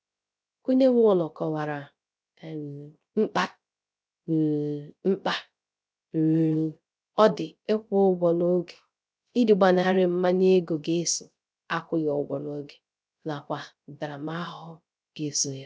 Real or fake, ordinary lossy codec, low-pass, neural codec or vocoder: fake; none; none; codec, 16 kHz, 0.3 kbps, FocalCodec